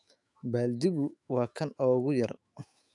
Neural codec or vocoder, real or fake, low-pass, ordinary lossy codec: autoencoder, 48 kHz, 128 numbers a frame, DAC-VAE, trained on Japanese speech; fake; 10.8 kHz; AAC, 64 kbps